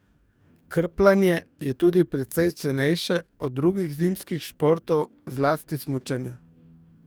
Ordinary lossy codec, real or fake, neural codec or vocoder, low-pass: none; fake; codec, 44.1 kHz, 2.6 kbps, DAC; none